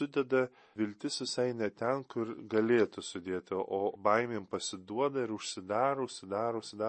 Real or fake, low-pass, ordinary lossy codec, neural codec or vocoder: real; 10.8 kHz; MP3, 32 kbps; none